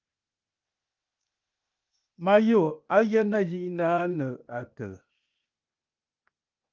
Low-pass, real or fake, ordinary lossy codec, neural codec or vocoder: 7.2 kHz; fake; Opus, 24 kbps; codec, 16 kHz, 0.8 kbps, ZipCodec